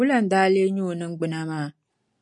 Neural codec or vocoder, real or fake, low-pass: none; real; 10.8 kHz